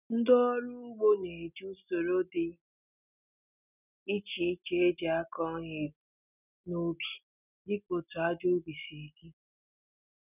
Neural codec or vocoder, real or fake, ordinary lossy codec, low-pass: none; real; none; 3.6 kHz